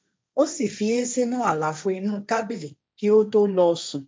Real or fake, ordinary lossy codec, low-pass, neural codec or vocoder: fake; none; none; codec, 16 kHz, 1.1 kbps, Voila-Tokenizer